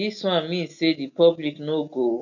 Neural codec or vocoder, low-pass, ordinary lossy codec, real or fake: none; 7.2 kHz; none; real